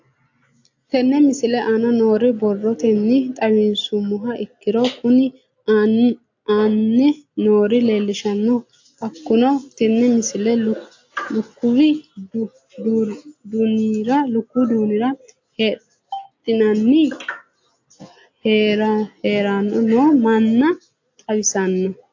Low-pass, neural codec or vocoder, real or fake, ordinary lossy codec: 7.2 kHz; none; real; AAC, 48 kbps